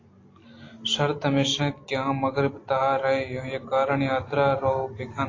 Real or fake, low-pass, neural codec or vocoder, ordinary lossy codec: real; 7.2 kHz; none; AAC, 32 kbps